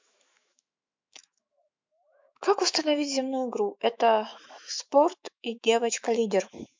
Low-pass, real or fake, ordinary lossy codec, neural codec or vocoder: 7.2 kHz; fake; MP3, 64 kbps; autoencoder, 48 kHz, 128 numbers a frame, DAC-VAE, trained on Japanese speech